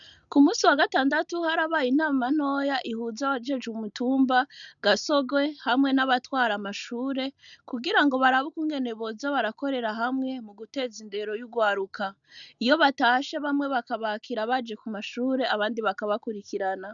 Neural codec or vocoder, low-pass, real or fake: none; 7.2 kHz; real